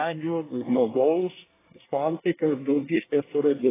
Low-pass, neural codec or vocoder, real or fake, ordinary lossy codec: 3.6 kHz; codec, 24 kHz, 1 kbps, SNAC; fake; AAC, 16 kbps